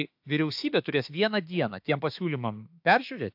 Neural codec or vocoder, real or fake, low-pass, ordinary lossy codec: autoencoder, 48 kHz, 32 numbers a frame, DAC-VAE, trained on Japanese speech; fake; 5.4 kHz; AAC, 48 kbps